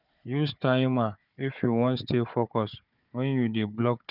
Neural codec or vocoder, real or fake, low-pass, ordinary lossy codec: codec, 16 kHz, 4 kbps, FunCodec, trained on Chinese and English, 50 frames a second; fake; 5.4 kHz; none